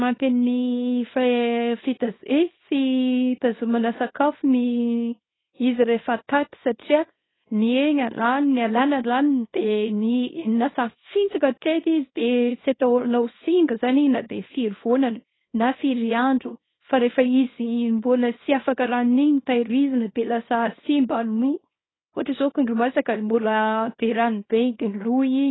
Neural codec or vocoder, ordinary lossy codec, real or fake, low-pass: codec, 24 kHz, 0.9 kbps, WavTokenizer, small release; AAC, 16 kbps; fake; 7.2 kHz